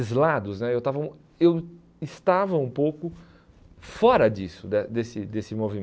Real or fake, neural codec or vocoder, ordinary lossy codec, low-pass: real; none; none; none